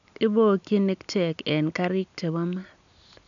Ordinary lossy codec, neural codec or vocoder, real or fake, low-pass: none; none; real; 7.2 kHz